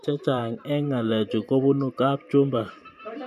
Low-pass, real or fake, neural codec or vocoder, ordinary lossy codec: 14.4 kHz; fake; vocoder, 44.1 kHz, 128 mel bands every 512 samples, BigVGAN v2; none